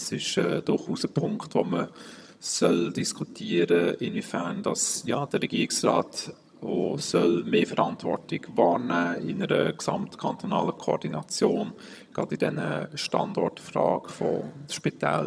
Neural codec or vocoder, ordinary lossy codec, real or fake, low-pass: vocoder, 22.05 kHz, 80 mel bands, HiFi-GAN; none; fake; none